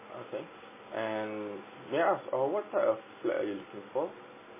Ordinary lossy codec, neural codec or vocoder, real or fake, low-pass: MP3, 16 kbps; none; real; 3.6 kHz